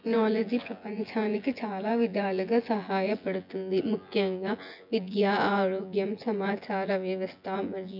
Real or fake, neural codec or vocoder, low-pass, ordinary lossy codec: fake; vocoder, 24 kHz, 100 mel bands, Vocos; 5.4 kHz; none